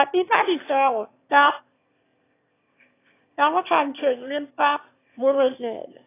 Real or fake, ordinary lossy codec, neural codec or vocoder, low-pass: fake; AAC, 24 kbps; autoencoder, 22.05 kHz, a latent of 192 numbers a frame, VITS, trained on one speaker; 3.6 kHz